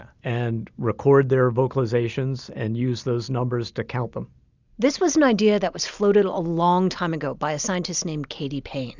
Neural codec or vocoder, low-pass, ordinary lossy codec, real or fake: none; 7.2 kHz; Opus, 64 kbps; real